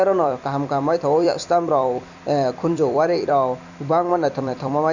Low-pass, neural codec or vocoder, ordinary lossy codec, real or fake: 7.2 kHz; vocoder, 44.1 kHz, 128 mel bands every 256 samples, BigVGAN v2; none; fake